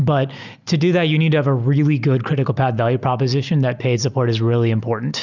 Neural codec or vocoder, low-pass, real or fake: none; 7.2 kHz; real